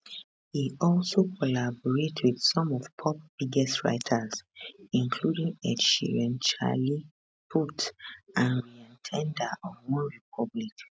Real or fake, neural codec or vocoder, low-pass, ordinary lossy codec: real; none; none; none